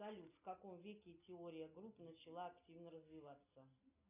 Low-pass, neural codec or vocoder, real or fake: 3.6 kHz; none; real